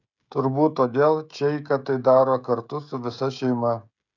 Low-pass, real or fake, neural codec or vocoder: 7.2 kHz; fake; codec, 16 kHz, 16 kbps, FreqCodec, smaller model